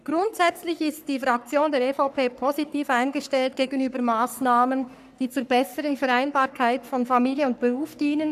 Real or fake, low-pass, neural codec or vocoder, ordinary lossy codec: fake; 14.4 kHz; codec, 44.1 kHz, 3.4 kbps, Pupu-Codec; none